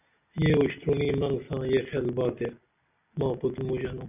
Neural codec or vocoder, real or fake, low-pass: none; real; 3.6 kHz